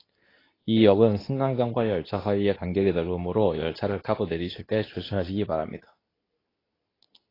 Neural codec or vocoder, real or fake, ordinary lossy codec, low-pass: codec, 24 kHz, 0.9 kbps, WavTokenizer, medium speech release version 2; fake; AAC, 24 kbps; 5.4 kHz